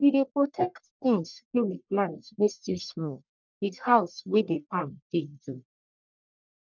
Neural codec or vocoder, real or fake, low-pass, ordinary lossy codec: codec, 44.1 kHz, 1.7 kbps, Pupu-Codec; fake; 7.2 kHz; none